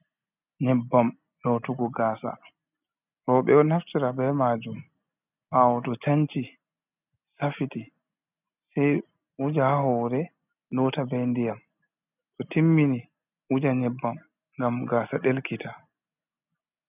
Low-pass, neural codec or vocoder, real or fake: 3.6 kHz; none; real